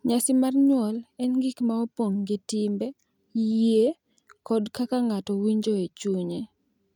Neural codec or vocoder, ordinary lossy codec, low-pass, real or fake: none; none; 19.8 kHz; real